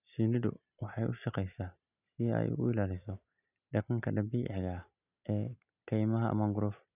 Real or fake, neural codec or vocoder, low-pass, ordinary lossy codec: real; none; 3.6 kHz; none